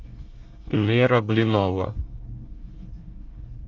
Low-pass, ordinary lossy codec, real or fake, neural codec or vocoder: 7.2 kHz; Opus, 32 kbps; fake; codec, 24 kHz, 1 kbps, SNAC